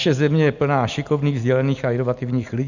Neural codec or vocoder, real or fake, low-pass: none; real; 7.2 kHz